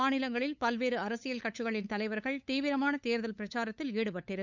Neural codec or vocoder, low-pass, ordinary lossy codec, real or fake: codec, 16 kHz, 8 kbps, FunCodec, trained on LibriTTS, 25 frames a second; 7.2 kHz; none; fake